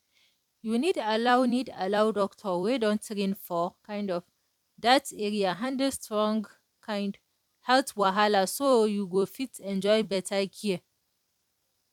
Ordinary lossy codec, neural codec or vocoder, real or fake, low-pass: none; vocoder, 44.1 kHz, 128 mel bands every 256 samples, BigVGAN v2; fake; 19.8 kHz